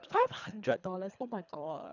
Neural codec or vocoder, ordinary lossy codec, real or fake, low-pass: codec, 24 kHz, 3 kbps, HILCodec; none; fake; 7.2 kHz